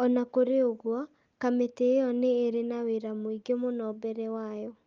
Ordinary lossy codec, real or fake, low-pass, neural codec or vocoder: Opus, 24 kbps; real; 7.2 kHz; none